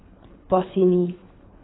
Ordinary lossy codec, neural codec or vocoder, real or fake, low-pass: AAC, 16 kbps; codec, 24 kHz, 3 kbps, HILCodec; fake; 7.2 kHz